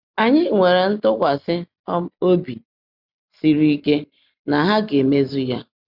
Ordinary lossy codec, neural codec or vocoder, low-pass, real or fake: Opus, 64 kbps; none; 5.4 kHz; real